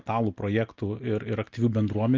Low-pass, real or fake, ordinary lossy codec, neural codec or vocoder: 7.2 kHz; real; Opus, 24 kbps; none